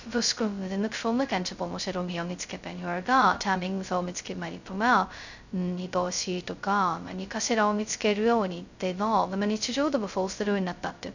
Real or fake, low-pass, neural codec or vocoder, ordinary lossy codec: fake; 7.2 kHz; codec, 16 kHz, 0.2 kbps, FocalCodec; none